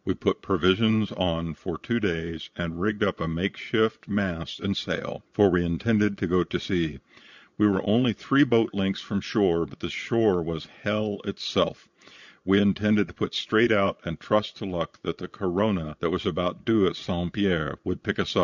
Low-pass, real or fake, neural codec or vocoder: 7.2 kHz; fake; vocoder, 22.05 kHz, 80 mel bands, Vocos